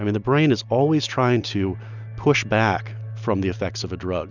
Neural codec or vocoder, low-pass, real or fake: none; 7.2 kHz; real